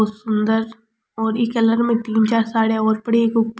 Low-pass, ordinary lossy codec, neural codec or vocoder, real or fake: none; none; none; real